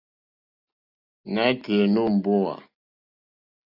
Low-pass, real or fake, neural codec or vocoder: 5.4 kHz; real; none